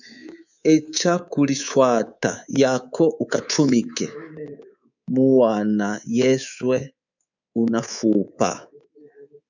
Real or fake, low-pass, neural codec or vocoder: fake; 7.2 kHz; codec, 24 kHz, 3.1 kbps, DualCodec